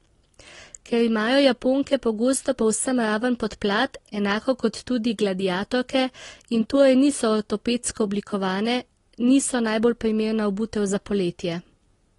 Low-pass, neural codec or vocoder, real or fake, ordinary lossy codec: 10.8 kHz; none; real; AAC, 32 kbps